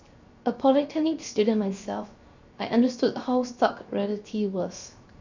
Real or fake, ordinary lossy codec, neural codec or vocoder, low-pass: fake; Opus, 64 kbps; codec, 16 kHz, 0.7 kbps, FocalCodec; 7.2 kHz